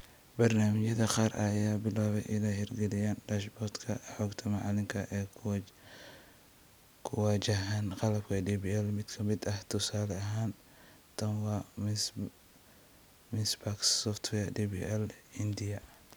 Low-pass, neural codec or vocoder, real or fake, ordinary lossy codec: none; none; real; none